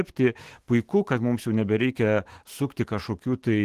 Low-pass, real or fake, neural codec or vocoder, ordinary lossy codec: 14.4 kHz; fake; autoencoder, 48 kHz, 128 numbers a frame, DAC-VAE, trained on Japanese speech; Opus, 16 kbps